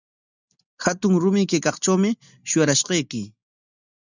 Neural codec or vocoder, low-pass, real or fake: none; 7.2 kHz; real